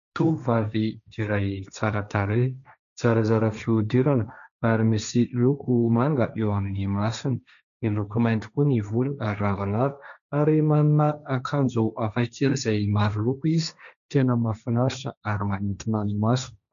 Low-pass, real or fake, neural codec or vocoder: 7.2 kHz; fake; codec, 16 kHz, 1.1 kbps, Voila-Tokenizer